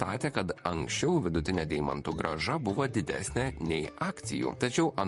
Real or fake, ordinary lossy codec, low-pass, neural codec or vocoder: fake; MP3, 48 kbps; 14.4 kHz; vocoder, 44.1 kHz, 128 mel bands, Pupu-Vocoder